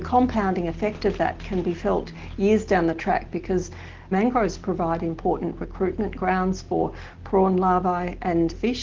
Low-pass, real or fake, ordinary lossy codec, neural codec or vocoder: 7.2 kHz; real; Opus, 16 kbps; none